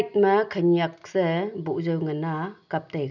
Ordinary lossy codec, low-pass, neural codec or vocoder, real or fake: none; 7.2 kHz; none; real